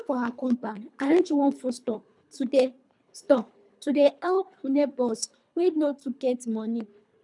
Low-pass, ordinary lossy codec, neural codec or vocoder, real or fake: 10.8 kHz; none; codec, 24 kHz, 3 kbps, HILCodec; fake